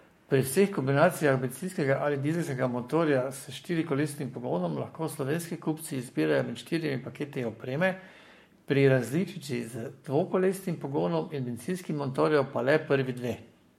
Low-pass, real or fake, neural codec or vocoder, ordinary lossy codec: 19.8 kHz; fake; codec, 44.1 kHz, 7.8 kbps, Pupu-Codec; MP3, 64 kbps